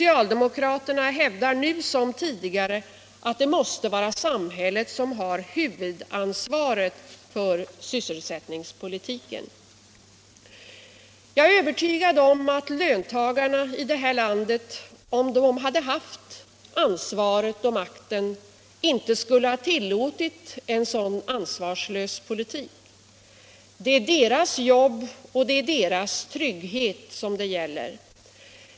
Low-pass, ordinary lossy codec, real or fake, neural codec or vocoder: none; none; real; none